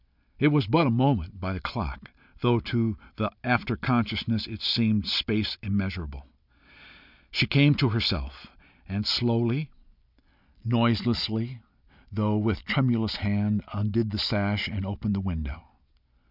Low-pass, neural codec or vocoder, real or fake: 5.4 kHz; none; real